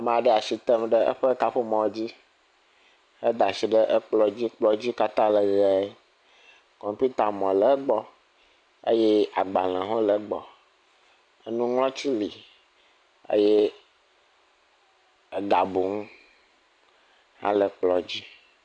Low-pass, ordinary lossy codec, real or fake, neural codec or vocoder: 9.9 kHz; MP3, 96 kbps; real; none